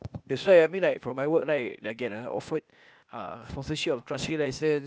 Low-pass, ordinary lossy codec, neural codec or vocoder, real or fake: none; none; codec, 16 kHz, 0.8 kbps, ZipCodec; fake